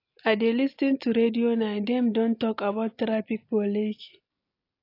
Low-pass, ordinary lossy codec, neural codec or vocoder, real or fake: 5.4 kHz; AAC, 32 kbps; none; real